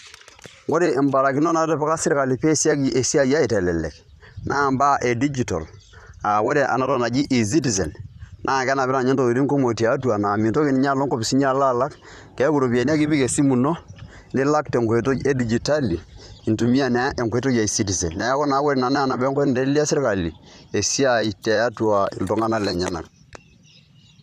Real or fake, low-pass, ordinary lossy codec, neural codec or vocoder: fake; 14.4 kHz; none; vocoder, 44.1 kHz, 128 mel bands, Pupu-Vocoder